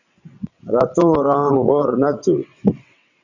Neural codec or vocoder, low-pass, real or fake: vocoder, 22.05 kHz, 80 mel bands, WaveNeXt; 7.2 kHz; fake